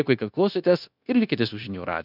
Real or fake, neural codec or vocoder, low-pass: fake; codec, 16 kHz, 0.9 kbps, LongCat-Audio-Codec; 5.4 kHz